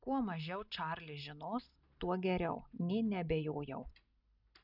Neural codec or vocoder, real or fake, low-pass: none; real; 5.4 kHz